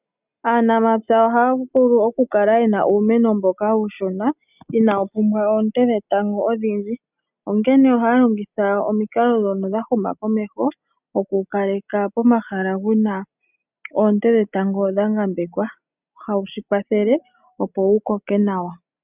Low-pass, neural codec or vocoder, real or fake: 3.6 kHz; none; real